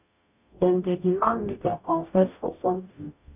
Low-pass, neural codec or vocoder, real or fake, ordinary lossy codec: 3.6 kHz; codec, 44.1 kHz, 0.9 kbps, DAC; fake; none